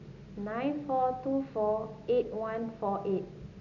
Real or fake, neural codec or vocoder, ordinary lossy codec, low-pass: real; none; none; 7.2 kHz